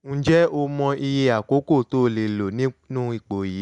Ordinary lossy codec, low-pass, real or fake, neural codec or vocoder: none; 10.8 kHz; real; none